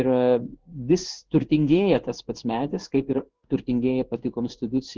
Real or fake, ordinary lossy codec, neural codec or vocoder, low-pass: fake; Opus, 16 kbps; codec, 16 kHz in and 24 kHz out, 1 kbps, XY-Tokenizer; 7.2 kHz